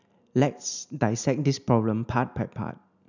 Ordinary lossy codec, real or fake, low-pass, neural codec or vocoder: none; real; 7.2 kHz; none